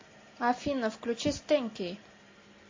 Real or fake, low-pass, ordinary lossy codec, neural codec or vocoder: real; 7.2 kHz; MP3, 32 kbps; none